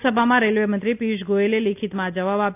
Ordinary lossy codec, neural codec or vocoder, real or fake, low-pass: AAC, 32 kbps; none; real; 3.6 kHz